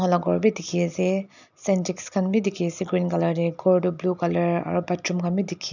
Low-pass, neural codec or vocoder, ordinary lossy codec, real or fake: 7.2 kHz; none; none; real